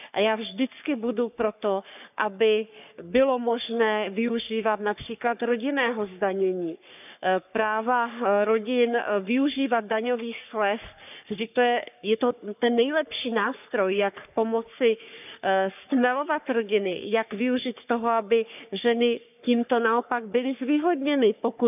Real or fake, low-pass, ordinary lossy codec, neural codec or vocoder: fake; 3.6 kHz; none; codec, 44.1 kHz, 3.4 kbps, Pupu-Codec